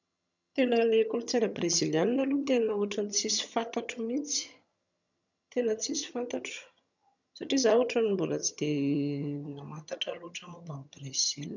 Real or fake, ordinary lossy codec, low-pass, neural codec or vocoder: fake; none; 7.2 kHz; vocoder, 22.05 kHz, 80 mel bands, HiFi-GAN